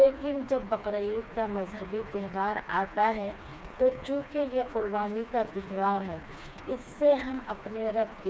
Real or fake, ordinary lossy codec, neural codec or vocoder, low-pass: fake; none; codec, 16 kHz, 2 kbps, FreqCodec, smaller model; none